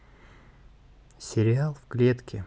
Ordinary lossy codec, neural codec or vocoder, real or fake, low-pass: none; none; real; none